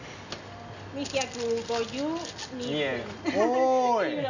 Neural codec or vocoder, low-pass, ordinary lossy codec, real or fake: none; 7.2 kHz; none; real